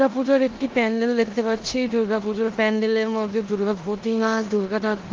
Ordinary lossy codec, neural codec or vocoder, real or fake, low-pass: Opus, 16 kbps; codec, 16 kHz in and 24 kHz out, 0.9 kbps, LongCat-Audio-Codec, four codebook decoder; fake; 7.2 kHz